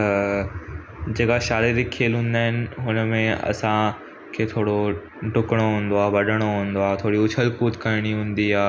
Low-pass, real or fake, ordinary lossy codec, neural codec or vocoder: none; real; none; none